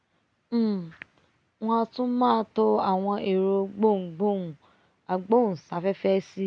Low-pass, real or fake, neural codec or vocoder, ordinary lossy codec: 9.9 kHz; real; none; none